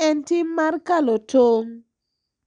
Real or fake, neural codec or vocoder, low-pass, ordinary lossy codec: real; none; 9.9 kHz; none